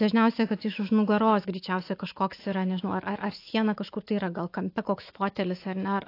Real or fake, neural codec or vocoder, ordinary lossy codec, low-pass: fake; autoencoder, 48 kHz, 128 numbers a frame, DAC-VAE, trained on Japanese speech; AAC, 32 kbps; 5.4 kHz